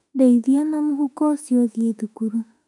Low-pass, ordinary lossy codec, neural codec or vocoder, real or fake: 10.8 kHz; none; autoencoder, 48 kHz, 32 numbers a frame, DAC-VAE, trained on Japanese speech; fake